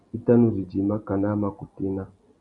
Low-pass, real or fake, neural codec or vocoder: 10.8 kHz; real; none